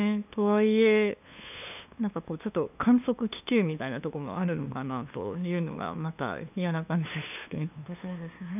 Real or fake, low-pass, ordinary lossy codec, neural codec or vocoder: fake; 3.6 kHz; none; codec, 16 kHz, 2 kbps, FunCodec, trained on LibriTTS, 25 frames a second